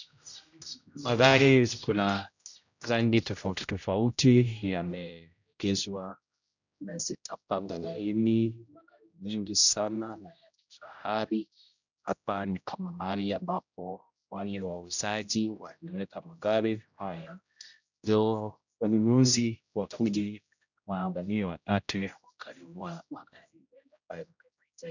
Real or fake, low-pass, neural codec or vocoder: fake; 7.2 kHz; codec, 16 kHz, 0.5 kbps, X-Codec, HuBERT features, trained on general audio